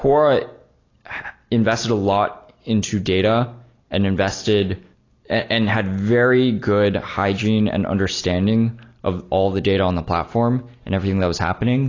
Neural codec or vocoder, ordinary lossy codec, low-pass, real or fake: none; AAC, 32 kbps; 7.2 kHz; real